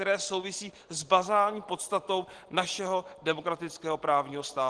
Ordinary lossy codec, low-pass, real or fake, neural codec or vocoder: Opus, 16 kbps; 9.9 kHz; real; none